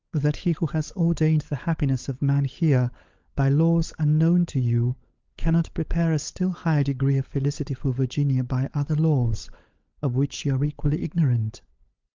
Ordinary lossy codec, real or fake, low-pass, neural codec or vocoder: Opus, 32 kbps; fake; 7.2 kHz; codec, 16 kHz, 16 kbps, FunCodec, trained on LibriTTS, 50 frames a second